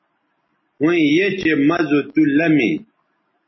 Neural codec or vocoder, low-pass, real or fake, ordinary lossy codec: none; 7.2 kHz; real; MP3, 24 kbps